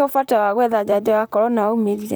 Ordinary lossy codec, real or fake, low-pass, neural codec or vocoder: none; fake; none; vocoder, 44.1 kHz, 128 mel bands, Pupu-Vocoder